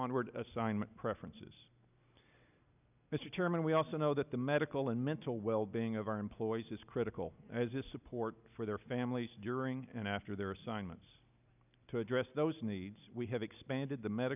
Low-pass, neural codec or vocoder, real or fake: 3.6 kHz; none; real